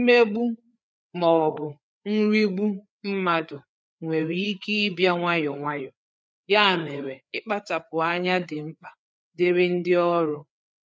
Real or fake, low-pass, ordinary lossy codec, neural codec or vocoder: fake; none; none; codec, 16 kHz, 4 kbps, FreqCodec, larger model